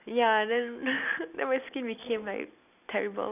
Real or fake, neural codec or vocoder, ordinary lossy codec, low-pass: real; none; none; 3.6 kHz